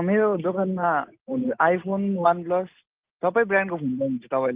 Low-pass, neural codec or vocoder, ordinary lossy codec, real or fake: 3.6 kHz; none; Opus, 32 kbps; real